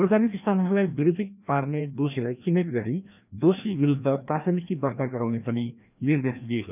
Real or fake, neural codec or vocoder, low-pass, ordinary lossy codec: fake; codec, 16 kHz, 1 kbps, FreqCodec, larger model; 3.6 kHz; none